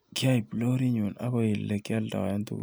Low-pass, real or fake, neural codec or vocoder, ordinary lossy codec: none; real; none; none